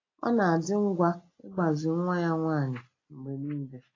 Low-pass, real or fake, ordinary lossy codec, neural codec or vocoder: 7.2 kHz; real; AAC, 32 kbps; none